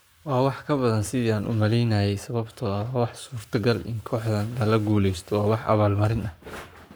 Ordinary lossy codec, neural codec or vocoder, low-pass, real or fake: none; codec, 44.1 kHz, 7.8 kbps, Pupu-Codec; none; fake